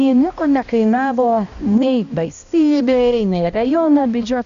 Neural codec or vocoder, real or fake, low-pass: codec, 16 kHz, 1 kbps, X-Codec, HuBERT features, trained on balanced general audio; fake; 7.2 kHz